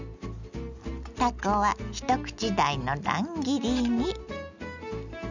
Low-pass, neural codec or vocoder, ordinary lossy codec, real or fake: 7.2 kHz; none; none; real